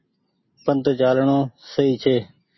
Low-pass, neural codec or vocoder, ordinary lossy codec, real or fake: 7.2 kHz; none; MP3, 24 kbps; real